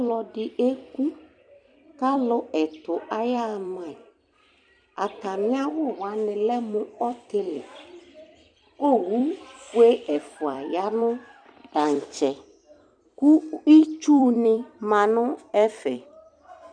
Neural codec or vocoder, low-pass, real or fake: vocoder, 44.1 kHz, 128 mel bands every 256 samples, BigVGAN v2; 9.9 kHz; fake